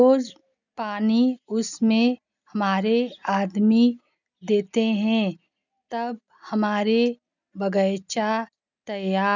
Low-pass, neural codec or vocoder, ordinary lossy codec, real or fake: 7.2 kHz; none; none; real